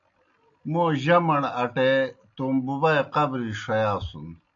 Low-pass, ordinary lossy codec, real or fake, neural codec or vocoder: 7.2 kHz; AAC, 64 kbps; real; none